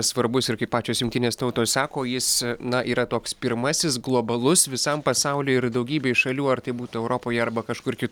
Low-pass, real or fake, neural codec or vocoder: 19.8 kHz; real; none